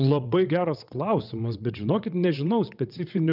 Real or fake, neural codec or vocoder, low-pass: fake; vocoder, 22.05 kHz, 80 mel bands, WaveNeXt; 5.4 kHz